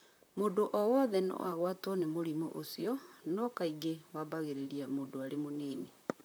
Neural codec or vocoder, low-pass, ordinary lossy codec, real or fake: vocoder, 44.1 kHz, 128 mel bands, Pupu-Vocoder; none; none; fake